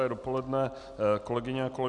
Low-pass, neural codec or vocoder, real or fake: 10.8 kHz; none; real